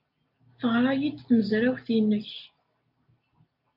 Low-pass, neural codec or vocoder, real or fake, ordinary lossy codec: 5.4 kHz; none; real; AAC, 48 kbps